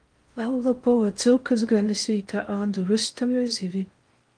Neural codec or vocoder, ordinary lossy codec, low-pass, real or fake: codec, 16 kHz in and 24 kHz out, 0.6 kbps, FocalCodec, streaming, 4096 codes; Opus, 32 kbps; 9.9 kHz; fake